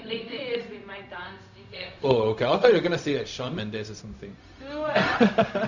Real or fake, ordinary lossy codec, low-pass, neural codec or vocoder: fake; none; 7.2 kHz; codec, 16 kHz, 0.4 kbps, LongCat-Audio-Codec